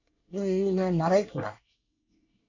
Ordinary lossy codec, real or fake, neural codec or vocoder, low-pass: none; fake; codec, 24 kHz, 1 kbps, SNAC; 7.2 kHz